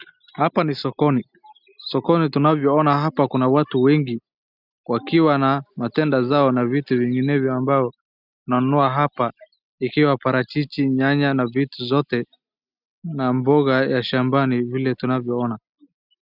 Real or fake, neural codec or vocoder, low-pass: real; none; 5.4 kHz